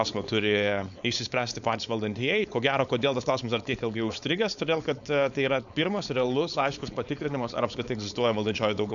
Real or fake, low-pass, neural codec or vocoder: fake; 7.2 kHz; codec, 16 kHz, 4.8 kbps, FACodec